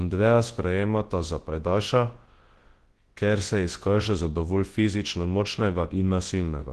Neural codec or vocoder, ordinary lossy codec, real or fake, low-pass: codec, 24 kHz, 0.9 kbps, WavTokenizer, large speech release; Opus, 16 kbps; fake; 10.8 kHz